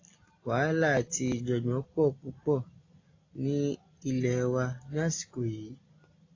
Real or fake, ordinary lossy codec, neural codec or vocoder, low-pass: real; AAC, 32 kbps; none; 7.2 kHz